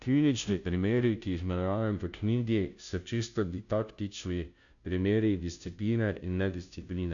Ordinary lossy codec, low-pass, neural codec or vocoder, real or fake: none; 7.2 kHz; codec, 16 kHz, 0.5 kbps, FunCodec, trained on Chinese and English, 25 frames a second; fake